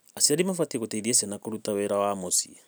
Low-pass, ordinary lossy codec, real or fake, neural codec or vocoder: none; none; real; none